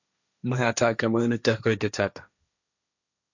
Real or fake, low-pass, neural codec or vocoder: fake; 7.2 kHz; codec, 16 kHz, 1.1 kbps, Voila-Tokenizer